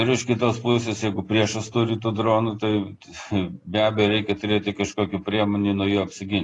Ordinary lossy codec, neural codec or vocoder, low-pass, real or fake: AAC, 32 kbps; none; 10.8 kHz; real